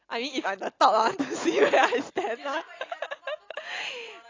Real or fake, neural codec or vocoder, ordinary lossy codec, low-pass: real; none; AAC, 32 kbps; 7.2 kHz